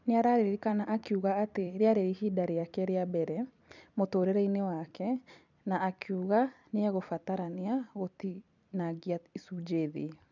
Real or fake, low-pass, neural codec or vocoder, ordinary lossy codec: fake; 7.2 kHz; vocoder, 44.1 kHz, 128 mel bands every 256 samples, BigVGAN v2; none